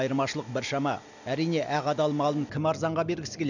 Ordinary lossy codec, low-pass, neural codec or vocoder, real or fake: none; 7.2 kHz; none; real